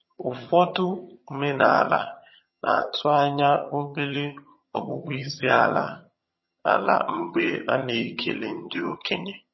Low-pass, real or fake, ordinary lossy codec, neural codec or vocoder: 7.2 kHz; fake; MP3, 24 kbps; vocoder, 22.05 kHz, 80 mel bands, HiFi-GAN